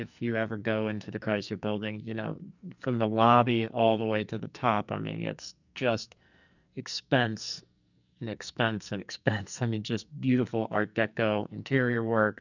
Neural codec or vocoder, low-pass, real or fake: codec, 44.1 kHz, 2.6 kbps, SNAC; 7.2 kHz; fake